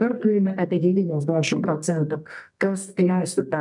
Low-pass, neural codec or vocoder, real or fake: 10.8 kHz; codec, 24 kHz, 0.9 kbps, WavTokenizer, medium music audio release; fake